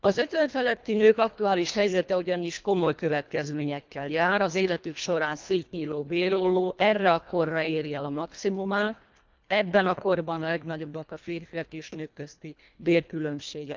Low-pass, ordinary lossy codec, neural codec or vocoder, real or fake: 7.2 kHz; Opus, 24 kbps; codec, 24 kHz, 1.5 kbps, HILCodec; fake